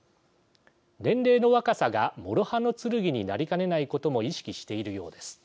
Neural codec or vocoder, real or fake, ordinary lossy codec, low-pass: none; real; none; none